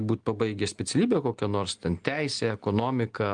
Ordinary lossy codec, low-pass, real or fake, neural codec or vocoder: Opus, 24 kbps; 9.9 kHz; real; none